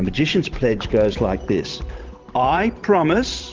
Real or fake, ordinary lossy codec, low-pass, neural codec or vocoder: fake; Opus, 32 kbps; 7.2 kHz; vocoder, 44.1 kHz, 128 mel bands every 512 samples, BigVGAN v2